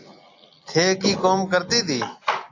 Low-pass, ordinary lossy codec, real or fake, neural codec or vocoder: 7.2 kHz; AAC, 48 kbps; real; none